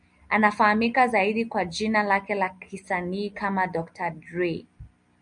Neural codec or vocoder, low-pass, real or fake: none; 9.9 kHz; real